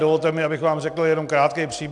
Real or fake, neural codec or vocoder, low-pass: real; none; 10.8 kHz